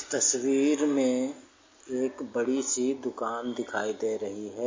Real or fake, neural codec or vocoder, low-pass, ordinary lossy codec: real; none; 7.2 kHz; MP3, 32 kbps